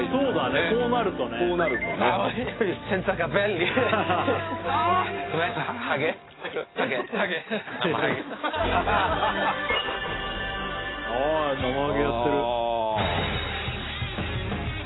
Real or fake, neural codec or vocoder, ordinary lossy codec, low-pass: real; none; AAC, 16 kbps; 7.2 kHz